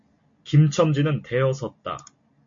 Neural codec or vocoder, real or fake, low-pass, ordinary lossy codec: none; real; 7.2 kHz; MP3, 48 kbps